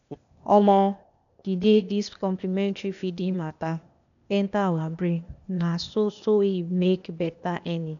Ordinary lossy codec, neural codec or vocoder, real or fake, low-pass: none; codec, 16 kHz, 0.8 kbps, ZipCodec; fake; 7.2 kHz